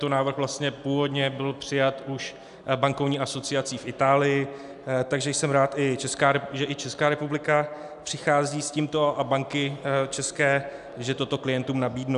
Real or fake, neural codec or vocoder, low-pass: real; none; 10.8 kHz